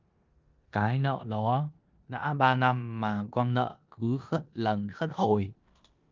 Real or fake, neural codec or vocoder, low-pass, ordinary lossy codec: fake; codec, 16 kHz in and 24 kHz out, 0.9 kbps, LongCat-Audio-Codec, four codebook decoder; 7.2 kHz; Opus, 24 kbps